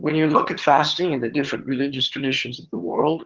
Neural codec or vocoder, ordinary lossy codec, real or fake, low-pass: vocoder, 22.05 kHz, 80 mel bands, HiFi-GAN; Opus, 16 kbps; fake; 7.2 kHz